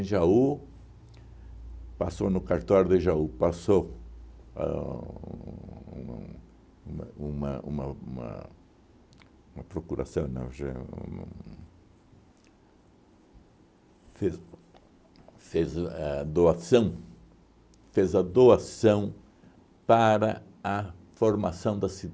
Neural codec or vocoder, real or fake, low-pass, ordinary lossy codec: none; real; none; none